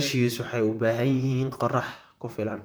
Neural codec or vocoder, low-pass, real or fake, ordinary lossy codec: vocoder, 44.1 kHz, 128 mel bands, Pupu-Vocoder; none; fake; none